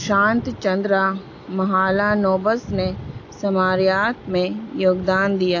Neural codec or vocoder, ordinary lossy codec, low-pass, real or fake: none; AAC, 48 kbps; 7.2 kHz; real